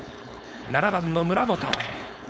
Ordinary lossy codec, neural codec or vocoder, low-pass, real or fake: none; codec, 16 kHz, 4.8 kbps, FACodec; none; fake